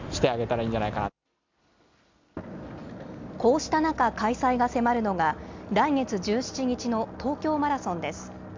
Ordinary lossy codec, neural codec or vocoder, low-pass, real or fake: MP3, 64 kbps; none; 7.2 kHz; real